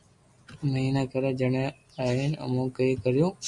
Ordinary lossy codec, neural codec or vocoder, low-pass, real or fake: MP3, 96 kbps; none; 10.8 kHz; real